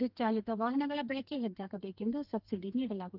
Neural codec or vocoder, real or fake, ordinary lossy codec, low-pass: codec, 32 kHz, 1.9 kbps, SNAC; fake; Opus, 24 kbps; 5.4 kHz